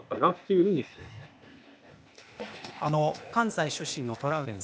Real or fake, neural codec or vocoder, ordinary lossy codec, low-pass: fake; codec, 16 kHz, 0.8 kbps, ZipCodec; none; none